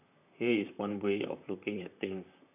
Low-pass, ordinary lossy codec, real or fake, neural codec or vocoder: 3.6 kHz; none; fake; vocoder, 44.1 kHz, 128 mel bands, Pupu-Vocoder